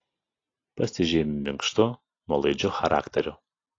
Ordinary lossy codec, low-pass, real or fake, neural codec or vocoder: AAC, 32 kbps; 7.2 kHz; real; none